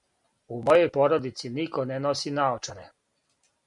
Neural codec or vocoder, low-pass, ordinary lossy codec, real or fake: none; 10.8 kHz; MP3, 96 kbps; real